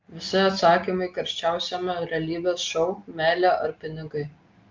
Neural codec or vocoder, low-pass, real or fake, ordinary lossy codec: none; 7.2 kHz; real; Opus, 32 kbps